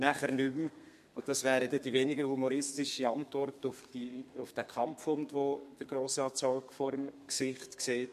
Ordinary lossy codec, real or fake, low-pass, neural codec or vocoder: MP3, 64 kbps; fake; 14.4 kHz; codec, 32 kHz, 1.9 kbps, SNAC